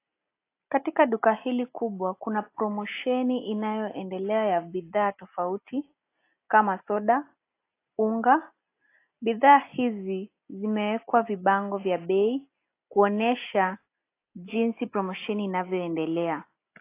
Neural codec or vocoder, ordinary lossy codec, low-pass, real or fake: none; AAC, 24 kbps; 3.6 kHz; real